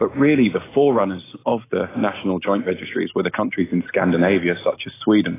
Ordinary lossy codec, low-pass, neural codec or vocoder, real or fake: AAC, 16 kbps; 3.6 kHz; none; real